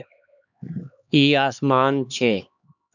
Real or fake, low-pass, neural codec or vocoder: fake; 7.2 kHz; codec, 16 kHz, 2 kbps, X-Codec, HuBERT features, trained on LibriSpeech